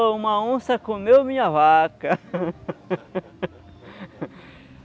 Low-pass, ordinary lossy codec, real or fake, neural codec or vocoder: none; none; real; none